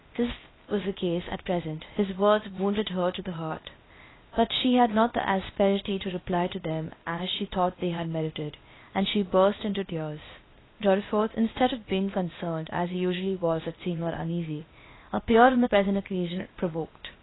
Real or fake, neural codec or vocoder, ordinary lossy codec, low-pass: fake; codec, 16 kHz, 0.8 kbps, ZipCodec; AAC, 16 kbps; 7.2 kHz